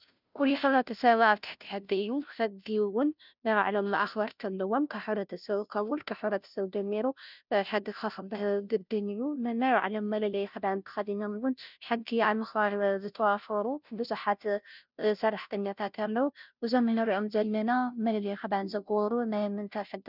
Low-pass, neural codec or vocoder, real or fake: 5.4 kHz; codec, 16 kHz, 0.5 kbps, FunCodec, trained on Chinese and English, 25 frames a second; fake